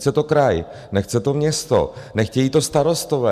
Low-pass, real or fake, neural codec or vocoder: 14.4 kHz; real; none